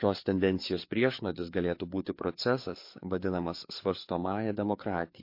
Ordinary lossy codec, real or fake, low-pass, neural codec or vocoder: MP3, 32 kbps; fake; 5.4 kHz; codec, 16 kHz, 4 kbps, FreqCodec, larger model